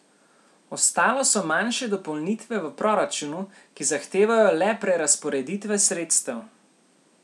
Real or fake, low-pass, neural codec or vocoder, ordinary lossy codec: real; none; none; none